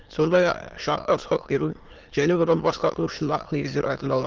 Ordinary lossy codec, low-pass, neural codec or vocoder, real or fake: Opus, 24 kbps; 7.2 kHz; autoencoder, 22.05 kHz, a latent of 192 numbers a frame, VITS, trained on many speakers; fake